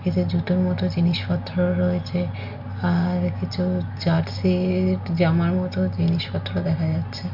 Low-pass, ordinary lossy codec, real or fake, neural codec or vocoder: 5.4 kHz; MP3, 48 kbps; real; none